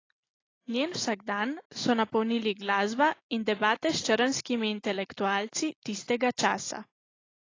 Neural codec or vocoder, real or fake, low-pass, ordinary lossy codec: none; real; 7.2 kHz; AAC, 32 kbps